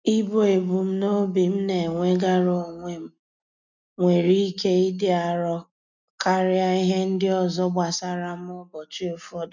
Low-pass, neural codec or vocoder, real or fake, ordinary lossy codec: 7.2 kHz; none; real; none